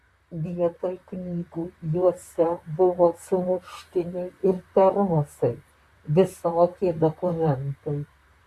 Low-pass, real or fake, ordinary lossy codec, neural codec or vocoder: 14.4 kHz; fake; Opus, 64 kbps; vocoder, 44.1 kHz, 128 mel bands, Pupu-Vocoder